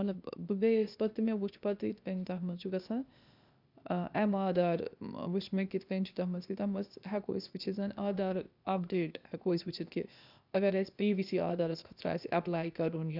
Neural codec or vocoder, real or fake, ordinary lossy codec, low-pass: codec, 16 kHz, 0.8 kbps, ZipCodec; fake; none; 5.4 kHz